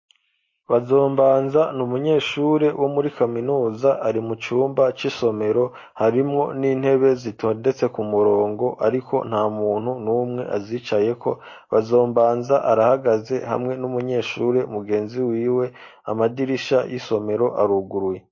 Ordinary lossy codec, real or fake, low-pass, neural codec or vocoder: MP3, 32 kbps; real; 7.2 kHz; none